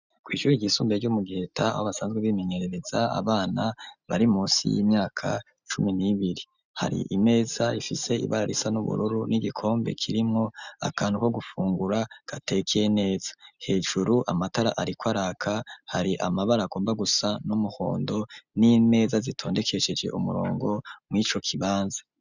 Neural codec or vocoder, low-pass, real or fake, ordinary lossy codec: none; 7.2 kHz; real; Opus, 64 kbps